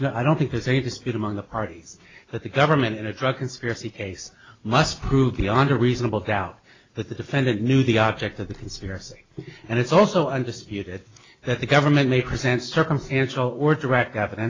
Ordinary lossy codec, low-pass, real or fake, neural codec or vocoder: AAC, 32 kbps; 7.2 kHz; real; none